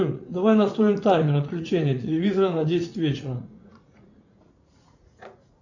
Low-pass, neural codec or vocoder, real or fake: 7.2 kHz; vocoder, 44.1 kHz, 80 mel bands, Vocos; fake